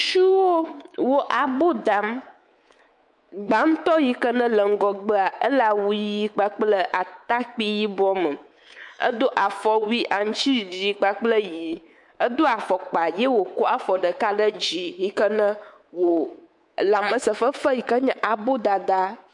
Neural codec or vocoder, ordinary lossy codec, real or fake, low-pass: codec, 24 kHz, 3.1 kbps, DualCodec; MP3, 64 kbps; fake; 10.8 kHz